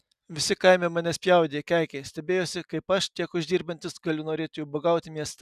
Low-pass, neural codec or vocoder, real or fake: 14.4 kHz; none; real